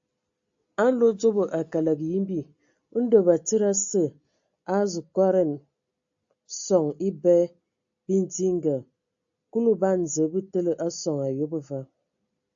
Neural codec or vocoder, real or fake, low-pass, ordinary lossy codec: none; real; 7.2 kHz; AAC, 64 kbps